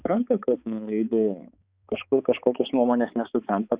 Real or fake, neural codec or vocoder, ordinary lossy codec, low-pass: fake; codec, 16 kHz, 4 kbps, X-Codec, HuBERT features, trained on balanced general audio; Opus, 64 kbps; 3.6 kHz